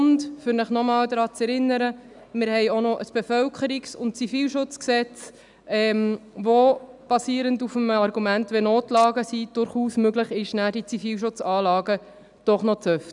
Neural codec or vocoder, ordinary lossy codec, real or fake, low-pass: none; none; real; 10.8 kHz